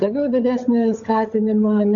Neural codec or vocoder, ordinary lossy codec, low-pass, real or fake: codec, 16 kHz, 16 kbps, FunCodec, trained on LibriTTS, 50 frames a second; AAC, 64 kbps; 7.2 kHz; fake